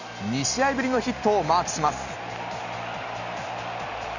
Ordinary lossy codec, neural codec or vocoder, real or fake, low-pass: none; none; real; 7.2 kHz